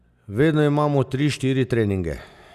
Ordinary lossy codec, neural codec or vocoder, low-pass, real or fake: none; none; 14.4 kHz; real